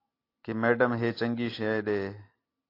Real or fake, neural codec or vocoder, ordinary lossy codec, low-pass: real; none; AAC, 32 kbps; 5.4 kHz